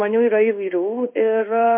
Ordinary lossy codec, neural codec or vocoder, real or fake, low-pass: MP3, 32 kbps; codec, 16 kHz in and 24 kHz out, 1 kbps, XY-Tokenizer; fake; 3.6 kHz